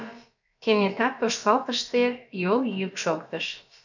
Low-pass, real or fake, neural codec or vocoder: 7.2 kHz; fake; codec, 16 kHz, about 1 kbps, DyCAST, with the encoder's durations